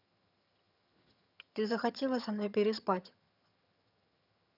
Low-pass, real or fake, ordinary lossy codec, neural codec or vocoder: 5.4 kHz; fake; none; vocoder, 22.05 kHz, 80 mel bands, HiFi-GAN